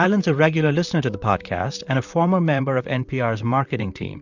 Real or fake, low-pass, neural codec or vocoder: fake; 7.2 kHz; vocoder, 44.1 kHz, 128 mel bands, Pupu-Vocoder